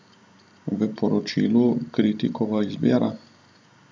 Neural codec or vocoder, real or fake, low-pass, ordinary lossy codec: none; real; none; none